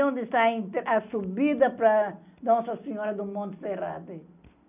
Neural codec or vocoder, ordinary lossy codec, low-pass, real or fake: none; none; 3.6 kHz; real